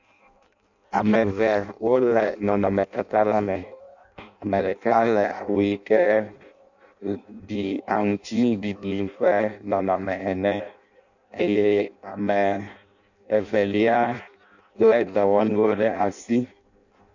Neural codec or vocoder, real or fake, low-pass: codec, 16 kHz in and 24 kHz out, 0.6 kbps, FireRedTTS-2 codec; fake; 7.2 kHz